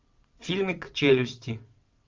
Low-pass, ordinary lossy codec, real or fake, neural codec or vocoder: 7.2 kHz; Opus, 32 kbps; fake; vocoder, 22.05 kHz, 80 mel bands, WaveNeXt